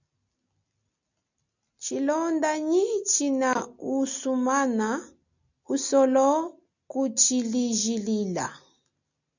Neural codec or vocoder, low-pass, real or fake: none; 7.2 kHz; real